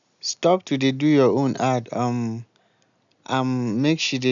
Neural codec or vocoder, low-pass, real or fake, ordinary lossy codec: none; 7.2 kHz; real; none